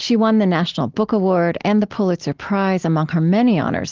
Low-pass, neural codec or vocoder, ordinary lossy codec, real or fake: 7.2 kHz; none; Opus, 16 kbps; real